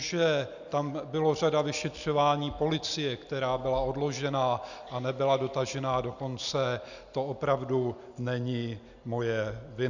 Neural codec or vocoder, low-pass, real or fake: none; 7.2 kHz; real